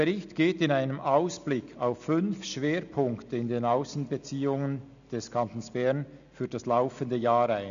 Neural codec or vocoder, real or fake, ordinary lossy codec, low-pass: none; real; none; 7.2 kHz